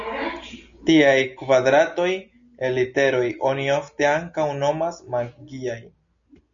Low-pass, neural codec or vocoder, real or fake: 7.2 kHz; none; real